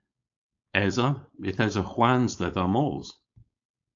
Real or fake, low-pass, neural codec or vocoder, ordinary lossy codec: fake; 7.2 kHz; codec, 16 kHz, 4.8 kbps, FACodec; AAC, 64 kbps